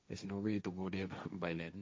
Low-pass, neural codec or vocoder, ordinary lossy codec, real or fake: none; codec, 16 kHz, 1.1 kbps, Voila-Tokenizer; none; fake